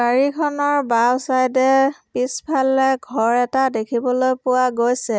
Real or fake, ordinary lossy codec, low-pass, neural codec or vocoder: real; none; none; none